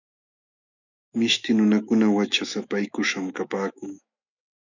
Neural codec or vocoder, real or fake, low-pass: autoencoder, 48 kHz, 128 numbers a frame, DAC-VAE, trained on Japanese speech; fake; 7.2 kHz